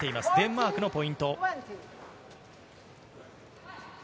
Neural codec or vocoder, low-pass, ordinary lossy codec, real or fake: none; none; none; real